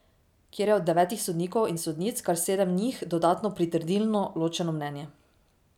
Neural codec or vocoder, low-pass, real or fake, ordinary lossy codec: none; 19.8 kHz; real; none